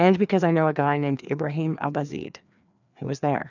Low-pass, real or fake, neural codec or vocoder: 7.2 kHz; fake; codec, 16 kHz, 2 kbps, FreqCodec, larger model